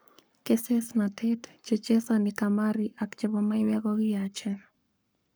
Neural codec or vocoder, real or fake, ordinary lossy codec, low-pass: codec, 44.1 kHz, 7.8 kbps, Pupu-Codec; fake; none; none